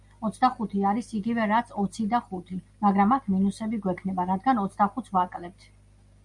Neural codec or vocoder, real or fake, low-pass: none; real; 10.8 kHz